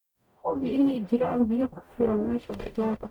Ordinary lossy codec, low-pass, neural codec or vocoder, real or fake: none; 19.8 kHz; codec, 44.1 kHz, 0.9 kbps, DAC; fake